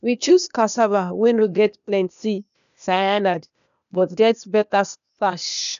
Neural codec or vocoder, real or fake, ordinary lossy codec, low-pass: codec, 16 kHz, 0.8 kbps, ZipCodec; fake; none; 7.2 kHz